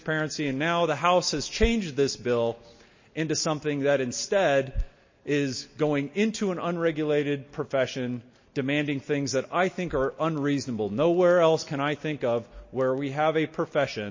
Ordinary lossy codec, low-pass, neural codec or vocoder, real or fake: MP3, 32 kbps; 7.2 kHz; none; real